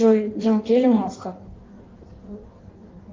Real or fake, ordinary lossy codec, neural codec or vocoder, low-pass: fake; Opus, 32 kbps; codec, 16 kHz in and 24 kHz out, 1.1 kbps, FireRedTTS-2 codec; 7.2 kHz